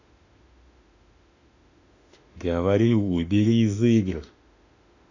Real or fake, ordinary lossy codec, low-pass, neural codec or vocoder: fake; none; 7.2 kHz; autoencoder, 48 kHz, 32 numbers a frame, DAC-VAE, trained on Japanese speech